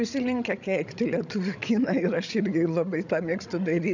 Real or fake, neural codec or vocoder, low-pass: fake; codec, 16 kHz, 16 kbps, FreqCodec, larger model; 7.2 kHz